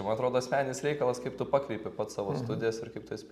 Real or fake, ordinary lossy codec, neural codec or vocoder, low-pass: real; Opus, 64 kbps; none; 14.4 kHz